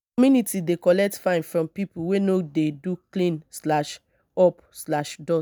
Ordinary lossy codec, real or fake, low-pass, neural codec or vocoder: none; real; none; none